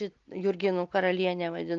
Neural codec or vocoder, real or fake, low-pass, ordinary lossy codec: none; real; 7.2 kHz; Opus, 24 kbps